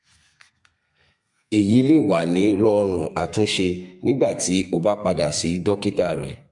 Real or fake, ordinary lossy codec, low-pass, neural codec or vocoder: fake; MP3, 64 kbps; 10.8 kHz; codec, 32 kHz, 1.9 kbps, SNAC